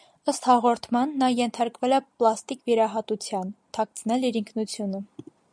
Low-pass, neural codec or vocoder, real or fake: 9.9 kHz; none; real